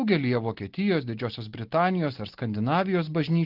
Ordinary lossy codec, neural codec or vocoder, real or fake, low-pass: Opus, 16 kbps; none; real; 5.4 kHz